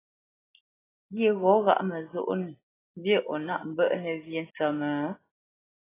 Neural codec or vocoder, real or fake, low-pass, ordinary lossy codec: none; real; 3.6 kHz; AAC, 16 kbps